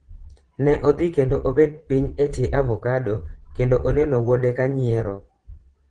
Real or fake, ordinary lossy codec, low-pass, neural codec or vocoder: fake; Opus, 16 kbps; 9.9 kHz; vocoder, 22.05 kHz, 80 mel bands, Vocos